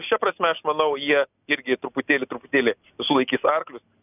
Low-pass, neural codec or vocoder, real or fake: 3.6 kHz; none; real